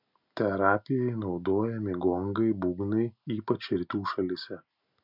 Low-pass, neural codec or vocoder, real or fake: 5.4 kHz; none; real